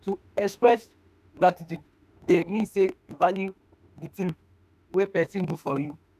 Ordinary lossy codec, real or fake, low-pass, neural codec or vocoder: none; fake; 14.4 kHz; autoencoder, 48 kHz, 32 numbers a frame, DAC-VAE, trained on Japanese speech